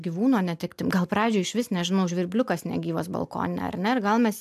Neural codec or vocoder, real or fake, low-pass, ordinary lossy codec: none; real; 14.4 kHz; AAC, 96 kbps